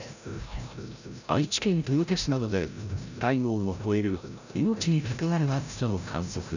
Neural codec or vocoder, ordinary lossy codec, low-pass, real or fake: codec, 16 kHz, 0.5 kbps, FreqCodec, larger model; none; 7.2 kHz; fake